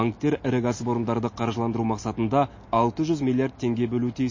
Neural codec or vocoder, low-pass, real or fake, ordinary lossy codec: none; 7.2 kHz; real; MP3, 32 kbps